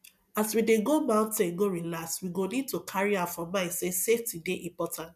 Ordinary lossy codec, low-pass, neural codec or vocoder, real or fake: none; 14.4 kHz; none; real